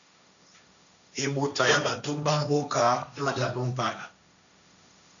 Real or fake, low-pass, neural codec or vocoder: fake; 7.2 kHz; codec, 16 kHz, 1.1 kbps, Voila-Tokenizer